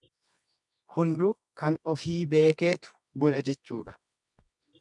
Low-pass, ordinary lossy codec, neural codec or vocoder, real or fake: 10.8 kHz; MP3, 96 kbps; codec, 24 kHz, 0.9 kbps, WavTokenizer, medium music audio release; fake